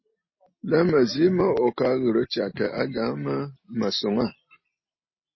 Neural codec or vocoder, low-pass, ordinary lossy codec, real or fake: none; 7.2 kHz; MP3, 24 kbps; real